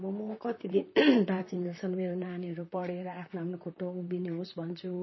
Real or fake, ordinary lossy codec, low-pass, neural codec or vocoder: fake; MP3, 24 kbps; 7.2 kHz; vocoder, 44.1 kHz, 128 mel bands, Pupu-Vocoder